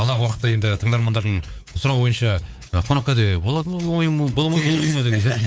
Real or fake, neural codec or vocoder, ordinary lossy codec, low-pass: fake; codec, 16 kHz, 4 kbps, X-Codec, WavLM features, trained on Multilingual LibriSpeech; none; none